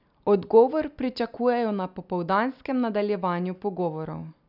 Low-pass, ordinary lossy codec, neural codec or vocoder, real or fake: 5.4 kHz; none; none; real